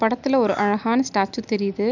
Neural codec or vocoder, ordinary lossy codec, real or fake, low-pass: none; none; real; 7.2 kHz